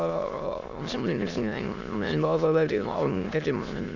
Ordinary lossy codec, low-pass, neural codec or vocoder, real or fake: none; 7.2 kHz; autoencoder, 22.05 kHz, a latent of 192 numbers a frame, VITS, trained on many speakers; fake